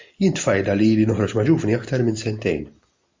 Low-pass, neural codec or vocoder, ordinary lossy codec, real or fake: 7.2 kHz; none; MP3, 64 kbps; real